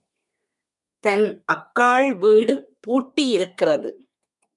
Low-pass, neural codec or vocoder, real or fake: 10.8 kHz; codec, 24 kHz, 1 kbps, SNAC; fake